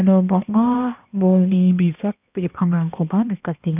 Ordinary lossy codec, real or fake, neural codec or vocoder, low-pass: none; fake; codec, 16 kHz, 1 kbps, X-Codec, HuBERT features, trained on balanced general audio; 3.6 kHz